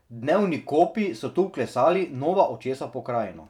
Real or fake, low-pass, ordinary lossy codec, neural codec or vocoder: fake; 19.8 kHz; none; vocoder, 44.1 kHz, 128 mel bands every 512 samples, BigVGAN v2